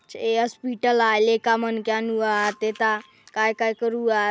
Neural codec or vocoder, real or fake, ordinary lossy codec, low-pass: none; real; none; none